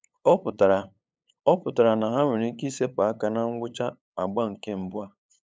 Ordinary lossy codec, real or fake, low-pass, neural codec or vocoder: none; fake; none; codec, 16 kHz, 8 kbps, FunCodec, trained on LibriTTS, 25 frames a second